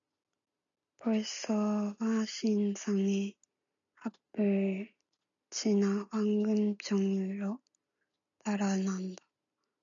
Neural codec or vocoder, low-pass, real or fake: none; 7.2 kHz; real